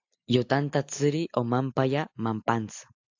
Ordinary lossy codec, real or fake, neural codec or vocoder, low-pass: AAC, 48 kbps; real; none; 7.2 kHz